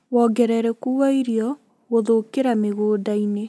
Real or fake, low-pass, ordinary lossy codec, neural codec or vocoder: real; none; none; none